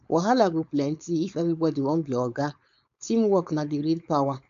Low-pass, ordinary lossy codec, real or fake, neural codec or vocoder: 7.2 kHz; none; fake; codec, 16 kHz, 4.8 kbps, FACodec